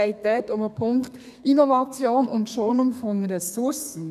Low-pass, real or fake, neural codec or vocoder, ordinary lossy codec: 14.4 kHz; fake; codec, 32 kHz, 1.9 kbps, SNAC; none